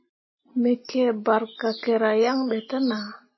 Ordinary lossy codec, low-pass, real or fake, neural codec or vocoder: MP3, 24 kbps; 7.2 kHz; real; none